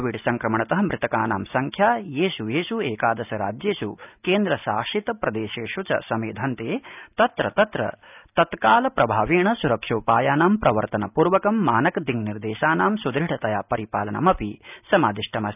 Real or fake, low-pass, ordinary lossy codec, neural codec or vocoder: real; 3.6 kHz; none; none